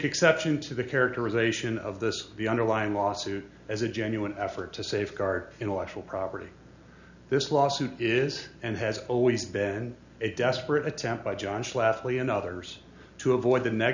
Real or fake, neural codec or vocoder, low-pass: real; none; 7.2 kHz